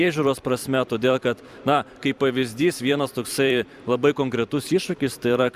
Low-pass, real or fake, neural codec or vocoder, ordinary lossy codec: 14.4 kHz; fake; vocoder, 44.1 kHz, 128 mel bands every 512 samples, BigVGAN v2; Opus, 64 kbps